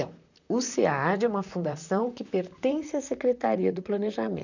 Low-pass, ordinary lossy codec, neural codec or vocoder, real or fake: 7.2 kHz; none; vocoder, 44.1 kHz, 128 mel bands, Pupu-Vocoder; fake